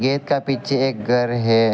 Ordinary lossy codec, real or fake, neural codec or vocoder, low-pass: none; real; none; none